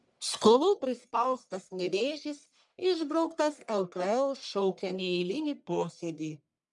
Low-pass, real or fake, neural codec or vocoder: 10.8 kHz; fake; codec, 44.1 kHz, 1.7 kbps, Pupu-Codec